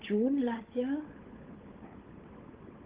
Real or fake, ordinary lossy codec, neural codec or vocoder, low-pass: fake; Opus, 16 kbps; codec, 16 kHz, 16 kbps, FunCodec, trained on LibriTTS, 50 frames a second; 3.6 kHz